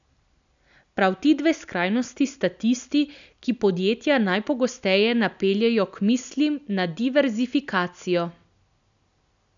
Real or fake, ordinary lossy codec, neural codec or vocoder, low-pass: real; none; none; 7.2 kHz